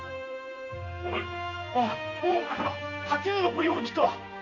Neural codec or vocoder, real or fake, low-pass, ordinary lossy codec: codec, 16 kHz in and 24 kHz out, 1 kbps, XY-Tokenizer; fake; 7.2 kHz; none